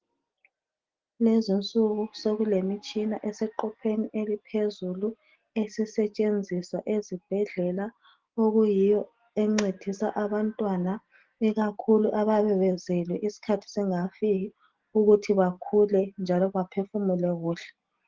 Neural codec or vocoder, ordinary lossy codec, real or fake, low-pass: none; Opus, 16 kbps; real; 7.2 kHz